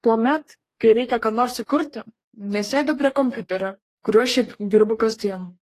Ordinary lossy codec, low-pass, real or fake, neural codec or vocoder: AAC, 48 kbps; 14.4 kHz; fake; codec, 44.1 kHz, 2.6 kbps, DAC